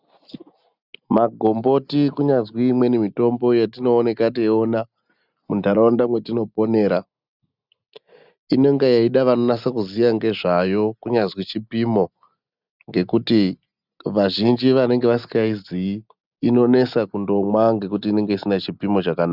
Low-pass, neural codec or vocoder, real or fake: 5.4 kHz; none; real